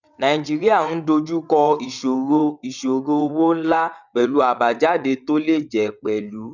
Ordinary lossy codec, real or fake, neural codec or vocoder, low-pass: none; fake; vocoder, 22.05 kHz, 80 mel bands, WaveNeXt; 7.2 kHz